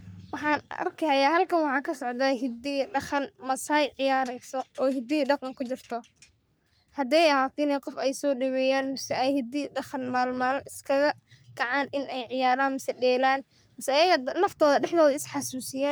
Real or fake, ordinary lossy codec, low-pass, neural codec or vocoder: fake; none; none; codec, 44.1 kHz, 3.4 kbps, Pupu-Codec